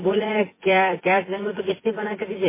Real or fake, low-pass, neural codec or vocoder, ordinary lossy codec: fake; 3.6 kHz; vocoder, 24 kHz, 100 mel bands, Vocos; MP3, 16 kbps